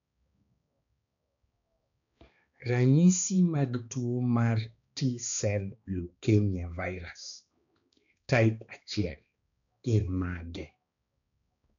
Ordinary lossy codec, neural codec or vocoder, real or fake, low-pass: none; codec, 16 kHz, 2 kbps, X-Codec, HuBERT features, trained on balanced general audio; fake; 7.2 kHz